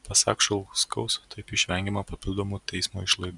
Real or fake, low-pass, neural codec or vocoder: real; 10.8 kHz; none